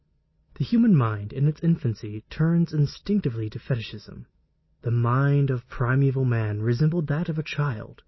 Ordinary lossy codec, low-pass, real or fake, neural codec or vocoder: MP3, 24 kbps; 7.2 kHz; real; none